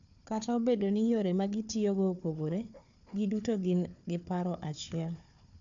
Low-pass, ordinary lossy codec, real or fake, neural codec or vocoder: 7.2 kHz; AAC, 64 kbps; fake; codec, 16 kHz, 4 kbps, FunCodec, trained on Chinese and English, 50 frames a second